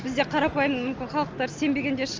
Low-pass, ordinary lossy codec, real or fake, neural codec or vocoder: 7.2 kHz; Opus, 24 kbps; real; none